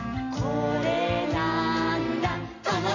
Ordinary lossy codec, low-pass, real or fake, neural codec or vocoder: none; 7.2 kHz; real; none